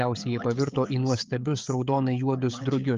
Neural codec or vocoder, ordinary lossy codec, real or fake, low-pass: codec, 16 kHz, 16 kbps, FreqCodec, smaller model; Opus, 24 kbps; fake; 7.2 kHz